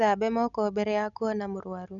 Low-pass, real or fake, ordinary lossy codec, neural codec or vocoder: 7.2 kHz; real; none; none